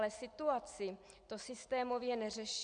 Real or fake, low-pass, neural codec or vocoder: real; 9.9 kHz; none